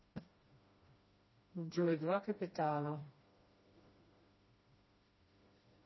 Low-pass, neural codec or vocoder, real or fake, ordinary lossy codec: 7.2 kHz; codec, 16 kHz, 1 kbps, FreqCodec, smaller model; fake; MP3, 24 kbps